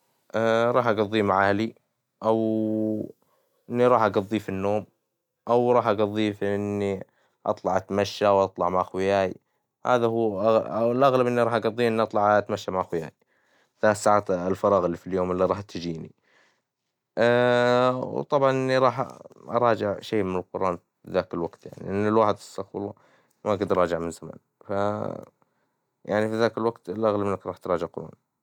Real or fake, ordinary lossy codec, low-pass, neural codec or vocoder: real; none; 19.8 kHz; none